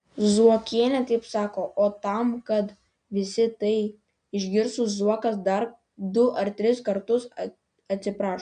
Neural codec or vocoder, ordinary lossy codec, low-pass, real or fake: none; MP3, 96 kbps; 9.9 kHz; real